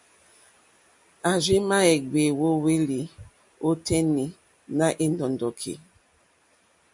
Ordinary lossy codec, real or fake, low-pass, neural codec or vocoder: MP3, 64 kbps; real; 10.8 kHz; none